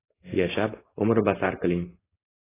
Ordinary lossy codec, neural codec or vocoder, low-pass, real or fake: AAC, 16 kbps; codec, 16 kHz, 4.8 kbps, FACodec; 3.6 kHz; fake